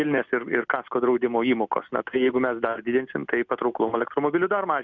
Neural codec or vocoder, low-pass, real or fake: none; 7.2 kHz; real